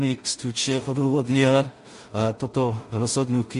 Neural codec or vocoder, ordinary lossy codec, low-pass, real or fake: codec, 16 kHz in and 24 kHz out, 0.4 kbps, LongCat-Audio-Codec, two codebook decoder; MP3, 48 kbps; 10.8 kHz; fake